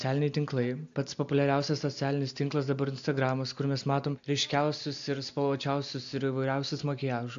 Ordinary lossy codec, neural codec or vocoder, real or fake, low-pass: AAC, 64 kbps; none; real; 7.2 kHz